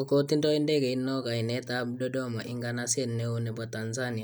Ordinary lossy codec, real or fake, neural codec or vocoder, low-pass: none; real; none; none